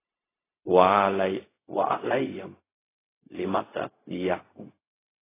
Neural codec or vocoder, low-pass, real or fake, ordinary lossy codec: codec, 16 kHz, 0.4 kbps, LongCat-Audio-Codec; 3.6 kHz; fake; AAC, 16 kbps